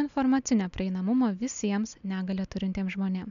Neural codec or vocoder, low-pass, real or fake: none; 7.2 kHz; real